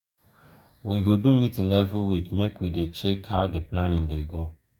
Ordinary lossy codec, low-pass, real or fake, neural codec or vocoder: none; 19.8 kHz; fake; codec, 44.1 kHz, 2.6 kbps, DAC